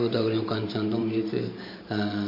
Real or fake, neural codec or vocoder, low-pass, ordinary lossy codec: fake; vocoder, 44.1 kHz, 128 mel bands every 512 samples, BigVGAN v2; 5.4 kHz; MP3, 32 kbps